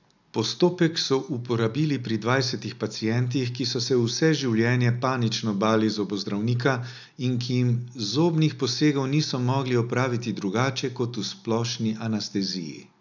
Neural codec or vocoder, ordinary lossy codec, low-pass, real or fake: none; none; 7.2 kHz; real